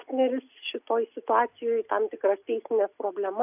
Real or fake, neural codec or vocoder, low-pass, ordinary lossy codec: real; none; 3.6 kHz; AAC, 32 kbps